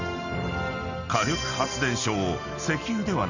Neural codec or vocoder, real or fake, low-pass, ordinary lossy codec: none; real; 7.2 kHz; none